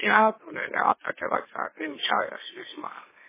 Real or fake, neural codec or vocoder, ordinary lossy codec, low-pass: fake; autoencoder, 44.1 kHz, a latent of 192 numbers a frame, MeloTTS; MP3, 16 kbps; 3.6 kHz